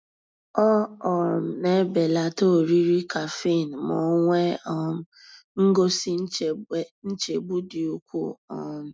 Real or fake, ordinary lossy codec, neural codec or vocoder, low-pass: real; none; none; none